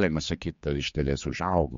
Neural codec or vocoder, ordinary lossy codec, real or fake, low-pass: codec, 16 kHz, 4 kbps, X-Codec, HuBERT features, trained on general audio; MP3, 48 kbps; fake; 7.2 kHz